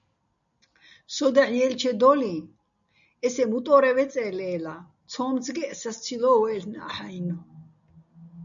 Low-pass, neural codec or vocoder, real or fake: 7.2 kHz; none; real